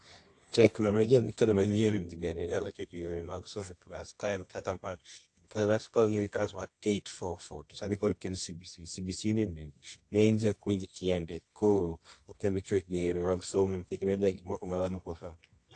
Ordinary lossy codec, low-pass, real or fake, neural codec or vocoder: AAC, 48 kbps; 10.8 kHz; fake; codec, 24 kHz, 0.9 kbps, WavTokenizer, medium music audio release